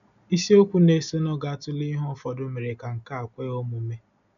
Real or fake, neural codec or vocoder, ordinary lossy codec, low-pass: real; none; none; 7.2 kHz